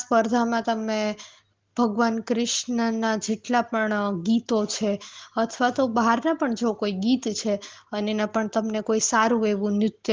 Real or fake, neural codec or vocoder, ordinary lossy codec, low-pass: real; none; Opus, 16 kbps; 7.2 kHz